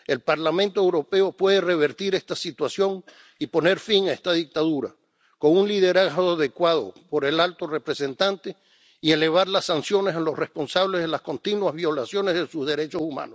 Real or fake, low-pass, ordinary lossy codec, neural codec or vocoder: real; none; none; none